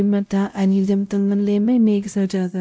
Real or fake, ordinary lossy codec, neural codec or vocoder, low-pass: fake; none; codec, 16 kHz, 0.5 kbps, X-Codec, WavLM features, trained on Multilingual LibriSpeech; none